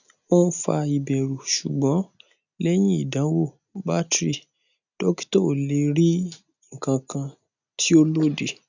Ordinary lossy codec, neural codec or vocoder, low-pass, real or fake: none; none; 7.2 kHz; real